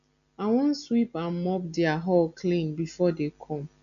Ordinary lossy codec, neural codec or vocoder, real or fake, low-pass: none; none; real; 7.2 kHz